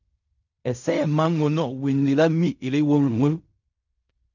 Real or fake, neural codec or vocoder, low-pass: fake; codec, 16 kHz in and 24 kHz out, 0.4 kbps, LongCat-Audio-Codec, fine tuned four codebook decoder; 7.2 kHz